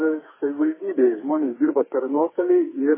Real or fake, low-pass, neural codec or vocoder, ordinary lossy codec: fake; 3.6 kHz; codec, 44.1 kHz, 2.6 kbps, SNAC; MP3, 16 kbps